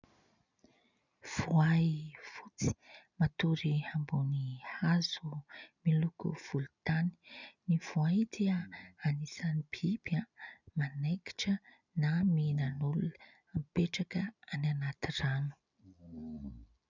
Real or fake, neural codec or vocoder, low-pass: real; none; 7.2 kHz